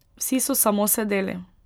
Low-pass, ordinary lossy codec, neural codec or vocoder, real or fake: none; none; none; real